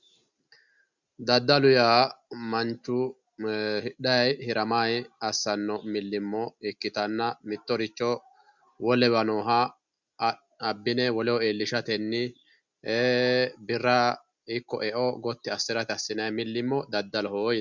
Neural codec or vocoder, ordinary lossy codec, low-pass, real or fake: none; Opus, 64 kbps; 7.2 kHz; real